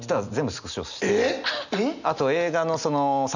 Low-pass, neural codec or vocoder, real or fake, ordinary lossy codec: 7.2 kHz; none; real; none